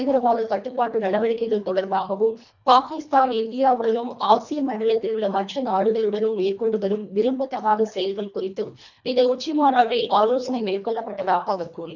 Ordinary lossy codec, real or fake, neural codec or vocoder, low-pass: none; fake; codec, 24 kHz, 1.5 kbps, HILCodec; 7.2 kHz